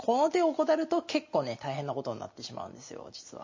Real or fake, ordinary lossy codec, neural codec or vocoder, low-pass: real; MP3, 32 kbps; none; 7.2 kHz